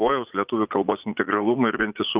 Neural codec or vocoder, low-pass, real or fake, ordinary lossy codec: vocoder, 44.1 kHz, 80 mel bands, Vocos; 3.6 kHz; fake; Opus, 32 kbps